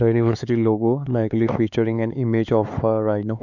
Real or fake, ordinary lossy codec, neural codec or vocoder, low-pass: fake; none; codec, 16 kHz, 4 kbps, X-Codec, HuBERT features, trained on LibriSpeech; 7.2 kHz